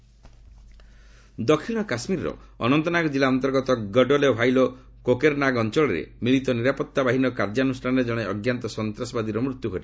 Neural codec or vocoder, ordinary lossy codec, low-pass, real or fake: none; none; none; real